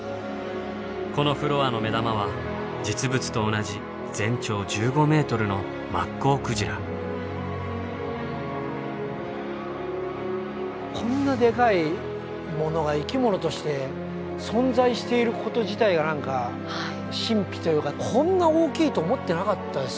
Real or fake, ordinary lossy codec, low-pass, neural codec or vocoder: real; none; none; none